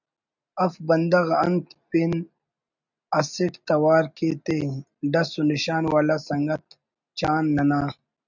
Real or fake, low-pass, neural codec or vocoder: real; 7.2 kHz; none